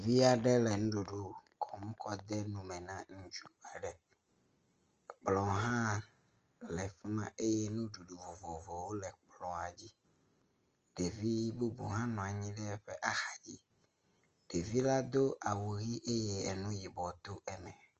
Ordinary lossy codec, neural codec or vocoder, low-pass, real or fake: Opus, 24 kbps; none; 7.2 kHz; real